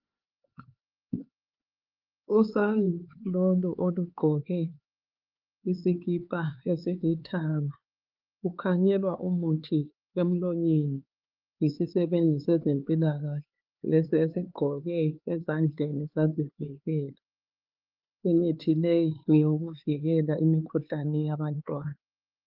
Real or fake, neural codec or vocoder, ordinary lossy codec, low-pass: fake; codec, 16 kHz, 4 kbps, X-Codec, HuBERT features, trained on LibriSpeech; Opus, 32 kbps; 5.4 kHz